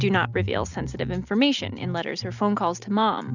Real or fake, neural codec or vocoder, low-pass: real; none; 7.2 kHz